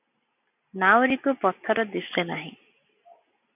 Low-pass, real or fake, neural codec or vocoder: 3.6 kHz; real; none